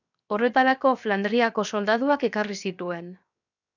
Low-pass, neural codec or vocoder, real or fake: 7.2 kHz; codec, 16 kHz, 0.7 kbps, FocalCodec; fake